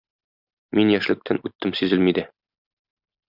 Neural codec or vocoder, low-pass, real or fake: none; 5.4 kHz; real